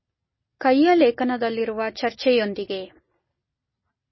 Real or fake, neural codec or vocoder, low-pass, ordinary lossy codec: real; none; 7.2 kHz; MP3, 24 kbps